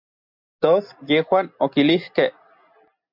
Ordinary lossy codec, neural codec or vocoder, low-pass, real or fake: MP3, 48 kbps; none; 5.4 kHz; real